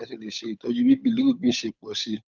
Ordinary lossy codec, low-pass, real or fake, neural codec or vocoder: none; none; fake; codec, 16 kHz, 8 kbps, FunCodec, trained on Chinese and English, 25 frames a second